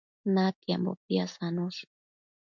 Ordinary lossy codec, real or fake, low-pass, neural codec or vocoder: MP3, 64 kbps; real; 7.2 kHz; none